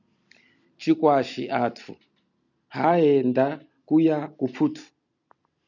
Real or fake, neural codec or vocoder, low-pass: real; none; 7.2 kHz